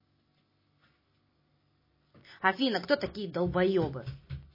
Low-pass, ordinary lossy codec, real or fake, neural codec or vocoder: 5.4 kHz; MP3, 24 kbps; real; none